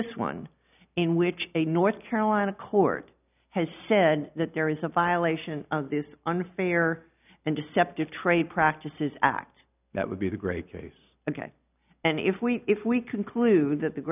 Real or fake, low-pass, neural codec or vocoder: real; 3.6 kHz; none